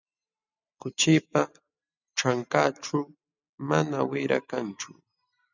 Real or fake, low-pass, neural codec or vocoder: real; 7.2 kHz; none